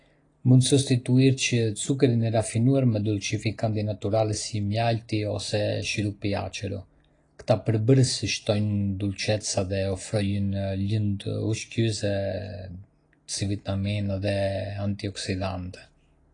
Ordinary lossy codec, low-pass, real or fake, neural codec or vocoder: AAC, 32 kbps; 9.9 kHz; real; none